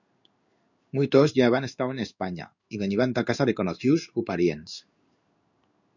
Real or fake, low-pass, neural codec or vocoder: fake; 7.2 kHz; codec, 16 kHz in and 24 kHz out, 1 kbps, XY-Tokenizer